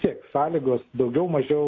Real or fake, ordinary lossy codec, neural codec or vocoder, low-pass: real; AAC, 32 kbps; none; 7.2 kHz